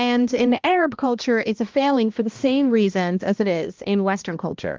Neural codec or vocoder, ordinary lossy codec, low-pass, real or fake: codec, 16 kHz, 1 kbps, X-Codec, HuBERT features, trained on balanced general audio; Opus, 24 kbps; 7.2 kHz; fake